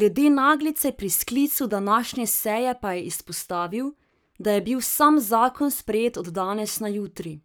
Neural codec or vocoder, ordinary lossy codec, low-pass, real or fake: codec, 44.1 kHz, 7.8 kbps, Pupu-Codec; none; none; fake